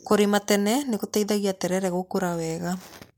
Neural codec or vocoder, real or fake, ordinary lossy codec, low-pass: none; real; MP3, 96 kbps; 19.8 kHz